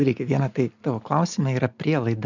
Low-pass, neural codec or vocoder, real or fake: 7.2 kHz; none; real